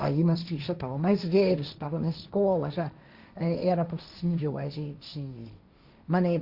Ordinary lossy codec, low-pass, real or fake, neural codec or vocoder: Opus, 64 kbps; 5.4 kHz; fake; codec, 16 kHz, 1.1 kbps, Voila-Tokenizer